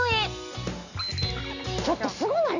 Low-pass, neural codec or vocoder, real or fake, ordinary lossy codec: 7.2 kHz; none; real; none